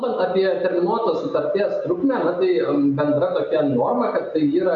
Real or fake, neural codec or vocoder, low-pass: real; none; 7.2 kHz